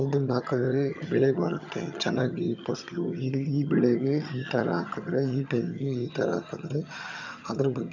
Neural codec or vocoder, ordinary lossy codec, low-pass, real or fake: vocoder, 22.05 kHz, 80 mel bands, HiFi-GAN; none; 7.2 kHz; fake